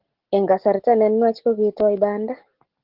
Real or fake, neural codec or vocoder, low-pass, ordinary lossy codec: real; none; 5.4 kHz; Opus, 16 kbps